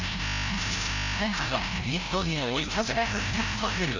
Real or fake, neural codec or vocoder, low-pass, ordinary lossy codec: fake; codec, 16 kHz, 0.5 kbps, FreqCodec, larger model; 7.2 kHz; AAC, 48 kbps